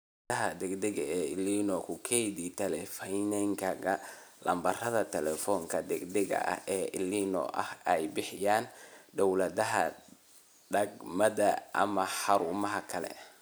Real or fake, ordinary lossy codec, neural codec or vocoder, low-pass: real; none; none; none